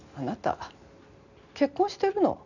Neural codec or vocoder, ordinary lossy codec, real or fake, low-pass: none; none; real; 7.2 kHz